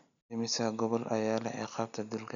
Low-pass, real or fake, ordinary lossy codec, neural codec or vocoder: 7.2 kHz; real; none; none